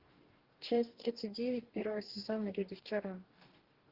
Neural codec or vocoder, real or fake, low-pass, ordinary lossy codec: codec, 44.1 kHz, 2.6 kbps, DAC; fake; 5.4 kHz; Opus, 16 kbps